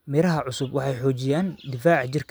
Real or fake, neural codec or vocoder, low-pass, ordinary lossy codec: real; none; none; none